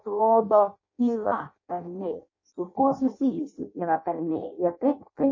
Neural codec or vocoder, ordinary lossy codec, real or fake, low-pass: codec, 16 kHz in and 24 kHz out, 0.6 kbps, FireRedTTS-2 codec; MP3, 32 kbps; fake; 7.2 kHz